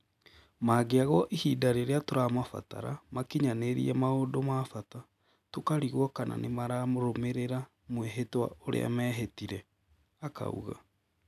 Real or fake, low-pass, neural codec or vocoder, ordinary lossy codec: real; 14.4 kHz; none; none